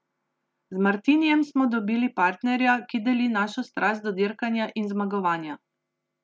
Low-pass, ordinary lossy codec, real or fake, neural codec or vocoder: none; none; real; none